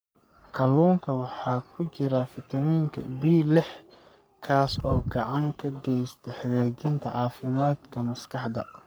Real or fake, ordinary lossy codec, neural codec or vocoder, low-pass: fake; none; codec, 44.1 kHz, 3.4 kbps, Pupu-Codec; none